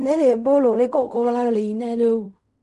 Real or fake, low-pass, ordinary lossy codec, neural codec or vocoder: fake; 10.8 kHz; none; codec, 16 kHz in and 24 kHz out, 0.4 kbps, LongCat-Audio-Codec, fine tuned four codebook decoder